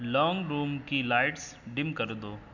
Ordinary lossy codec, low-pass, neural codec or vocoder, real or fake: none; 7.2 kHz; none; real